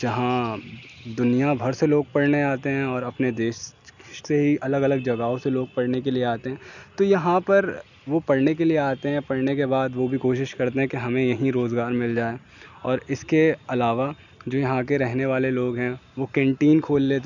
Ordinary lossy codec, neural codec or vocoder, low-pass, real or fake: none; none; 7.2 kHz; real